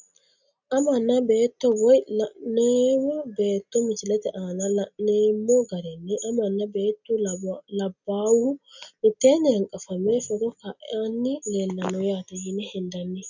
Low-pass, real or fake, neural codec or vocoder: 7.2 kHz; real; none